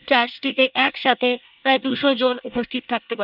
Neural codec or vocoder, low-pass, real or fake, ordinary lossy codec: codec, 24 kHz, 1 kbps, SNAC; 5.4 kHz; fake; none